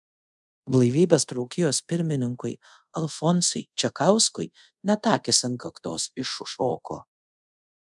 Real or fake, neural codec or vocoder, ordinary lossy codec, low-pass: fake; codec, 24 kHz, 0.5 kbps, DualCodec; MP3, 96 kbps; 10.8 kHz